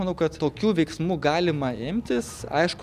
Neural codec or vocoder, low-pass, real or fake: none; 14.4 kHz; real